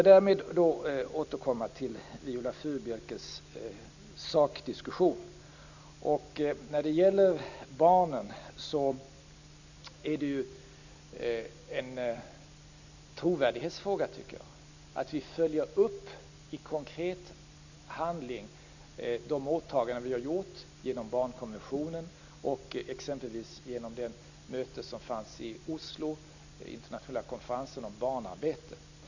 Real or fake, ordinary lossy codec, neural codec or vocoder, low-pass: real; none; none; 7.2 kHz